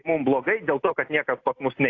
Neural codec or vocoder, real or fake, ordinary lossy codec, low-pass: none; real; AAC, 48 kbps; 7.2 kHz